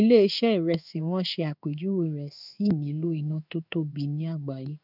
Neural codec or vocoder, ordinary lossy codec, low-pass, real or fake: codec, 16 kHz in and 24 kHz out, 1 kbps, XY-Tokenizer; none; 5.4 kHz; fake